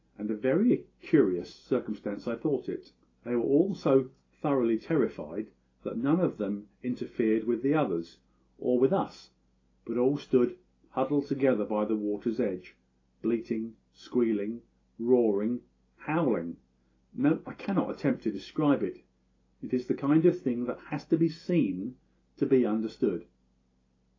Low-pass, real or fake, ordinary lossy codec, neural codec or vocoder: 7.2 kHz; real; AAC, 32 kbps; none